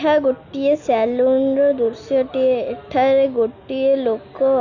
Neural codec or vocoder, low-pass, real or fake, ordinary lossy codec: none; 7.2 kHz; real; Opus, 64 kbps